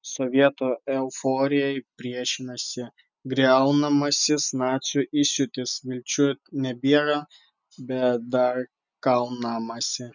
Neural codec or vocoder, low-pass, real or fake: none; 7.2 kHz; real